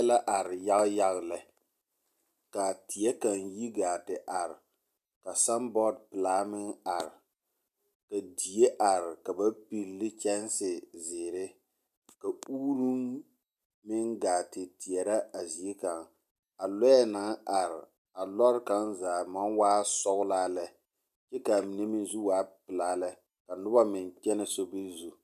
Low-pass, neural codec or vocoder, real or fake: 14.4 kHz; none; real